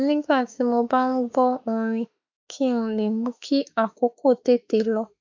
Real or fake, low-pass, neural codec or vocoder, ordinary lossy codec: fake; 7.2 kHz; autoencoder, 48 kHz, 32 numbers a frame, DAC-VAE, trained on Japanese speech; MP3, 64 kbps